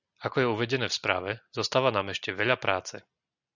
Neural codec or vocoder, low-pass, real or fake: none; 7.2 kHz; real